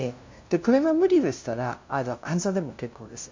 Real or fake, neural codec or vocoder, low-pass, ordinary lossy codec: fake; codec, 16 kHz, 0.5 kbps, FunCodec, trained on LibriTTS, 25 frames a second; 7.2 kHz; MP3, 48 kbps